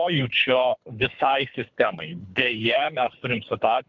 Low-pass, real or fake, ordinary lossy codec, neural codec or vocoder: 7.2 kHz; fake; MP3, 64 kbps; codec, 24 kHz, 3 kbps, HILCodec